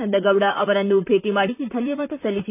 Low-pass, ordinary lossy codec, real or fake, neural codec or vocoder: 3.6 kHz; none; fake; vocoder, 44.1 kHz, 128 mel bands, Pupu-Vocoder